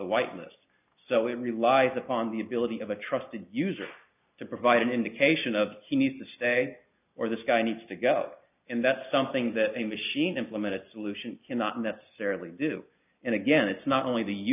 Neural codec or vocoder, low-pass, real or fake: none; 3.6 kHz; real